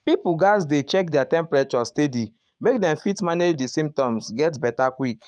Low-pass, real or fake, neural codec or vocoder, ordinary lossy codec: 9.9 kHz; fake; codec, 44.1 kHz, 7.8 kbps, DAC; none